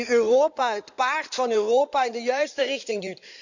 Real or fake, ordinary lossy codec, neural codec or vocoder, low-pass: fake; none; codec, 16 kHz in and 24 kHz out, 2.2 kbps, FireRedTTS-2 codec; 7.2 kHz